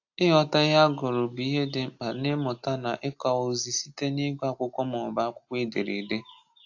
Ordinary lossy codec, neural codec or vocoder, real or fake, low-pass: AAC, 48 kbps; none; real; 7.2 kHz